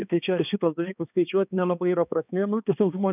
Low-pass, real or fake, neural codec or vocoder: 3.6 kHz; fake; codec, 16 kHz, 2 kbps, X-Codec, HuBERT features, trained on balanced general audio